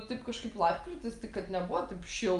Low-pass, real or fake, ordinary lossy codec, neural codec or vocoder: 10.8 kHz; real; Opus, 32 kbps; none